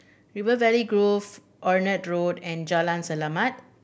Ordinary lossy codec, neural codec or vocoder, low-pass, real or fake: none; none; none; real